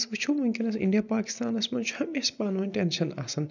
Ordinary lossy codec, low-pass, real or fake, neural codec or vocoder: none; 7.2 kHz; real; none